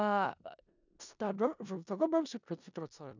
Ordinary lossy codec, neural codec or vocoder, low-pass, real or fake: none; codec, 16 kHz in and 24 kHz out, 0.4 kbps, LongCat-Audio-Codec, four codebook decoder; 7.2 kHz; fake